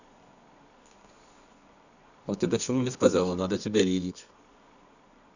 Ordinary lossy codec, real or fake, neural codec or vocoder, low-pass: none; fake; codec, 24 kHz, 0.9 kbps, WavTokenizer, medium music audio release; 7.2 kHz